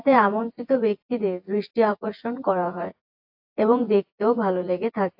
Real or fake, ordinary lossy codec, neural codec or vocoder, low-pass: fake; AAC, 48 kbps; vocoder, 24 kHz, 100 mel bands, Vocos; 5.4 kHz